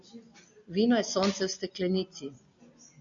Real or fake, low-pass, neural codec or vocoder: real; 7.2 kHz; none